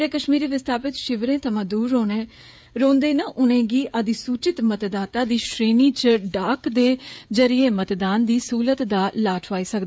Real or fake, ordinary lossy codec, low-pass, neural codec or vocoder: fake; none; none; codec, 16 kHz, 16 kbps, FreqCodec, smaller model